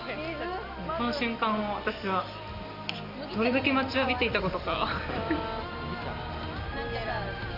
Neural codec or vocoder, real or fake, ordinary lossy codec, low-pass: none; real; Opus, 64 kbps; 5.4 kHz